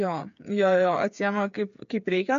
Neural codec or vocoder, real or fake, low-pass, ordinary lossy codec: codec, 16 kHz, 8 kbps, FreqCodec, smaller model; fake; 7.2 kHz; MP3, 48 kbps